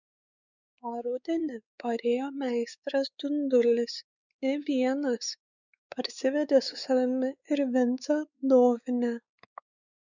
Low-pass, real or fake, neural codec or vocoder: 7.2 kHz; fake; codec, 16 kHz, 4 kbps, X-Codec, WavLM features, trained on Multilingual LibriSpeech